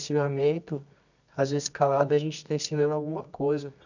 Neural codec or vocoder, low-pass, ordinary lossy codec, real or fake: codec, 24 kHz, 0.9 kbps, WavTokenizer, medium music audio release; 7.2 kHz; none; fake